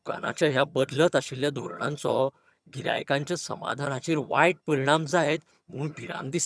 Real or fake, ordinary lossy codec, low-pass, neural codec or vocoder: fake; none; none; vocoder, 22.05 kHz, 80 mel bands, HiFi-GAN